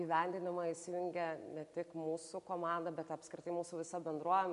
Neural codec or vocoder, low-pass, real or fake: none; 10.8 kHz; real